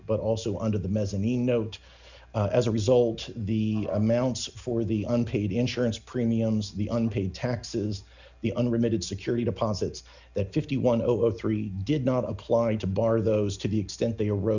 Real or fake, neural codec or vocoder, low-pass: real; none; 7.2 kHz